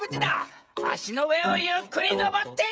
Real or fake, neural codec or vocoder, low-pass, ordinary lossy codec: fake; codec, 16 kHz, 8 kbps, FreqCodec, smaller model; none; none